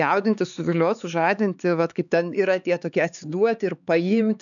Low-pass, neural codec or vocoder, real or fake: 7.2 kHz; codec, 16 kHz, 4 kbps, X-Codec, HuBERT features, trained on LibriSpeech; fake